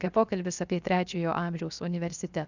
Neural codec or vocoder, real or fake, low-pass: codec, 16 kHz, 0.7 kbps, FocalCodec; fake; 7.2 kHz